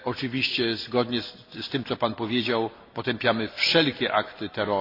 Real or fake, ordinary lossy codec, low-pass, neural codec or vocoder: real; AAC, 32 kbps; 5.4 kHz; none